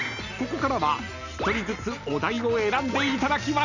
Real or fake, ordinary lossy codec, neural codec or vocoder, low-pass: real; none; none; 7.2 kHz